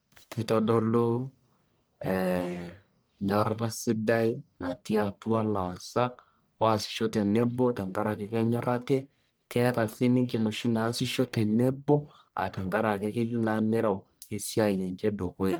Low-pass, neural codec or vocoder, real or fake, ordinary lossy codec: none; codec, 44.1 kHz, 1.7 kbps, Pupu-Codec; fake; none